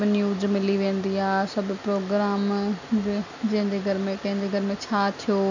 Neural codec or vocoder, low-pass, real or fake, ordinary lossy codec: none; 7.2 kHz; real; none